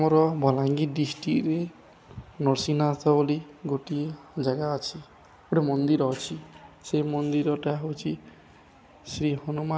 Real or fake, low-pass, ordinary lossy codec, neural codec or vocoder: real; none; none; none